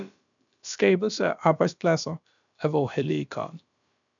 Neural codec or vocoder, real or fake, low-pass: codec, 16 kHz, about 1 kbps, DyCAST, with the encoder's durations; fake; 7.2 kHz